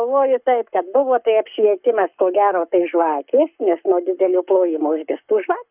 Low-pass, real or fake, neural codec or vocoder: 3.6 kHz; fake; codec, 44.1 kHz, 7.8 kbps, Pupu-Codec